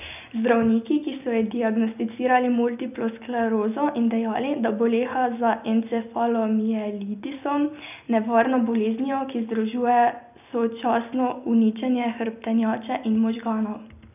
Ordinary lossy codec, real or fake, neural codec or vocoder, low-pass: none; real; none; 3.6 kHz